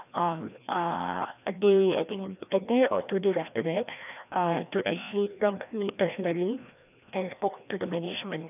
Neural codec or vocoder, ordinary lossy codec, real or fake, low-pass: codec, 16 kHz, 1 kbps, FreqCodec, larger model; none; fake; 3.6 kHz